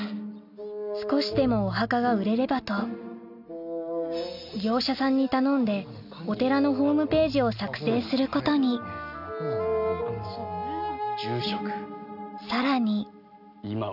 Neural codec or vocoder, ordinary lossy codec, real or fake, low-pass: none; none; real; 5.4 kHz